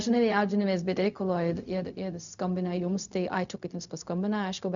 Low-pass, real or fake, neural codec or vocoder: 7.2 kHz; fake; codec, 16 kHz, 0.4 kbps, LongCat-Audio-Codec